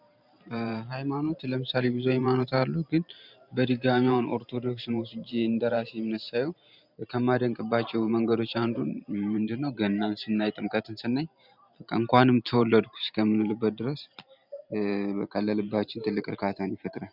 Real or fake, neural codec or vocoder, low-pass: fake; vocoder, 44.1 kHz, 128 mel bands every 512 samples, BigVGAN v2; 5.4 kHz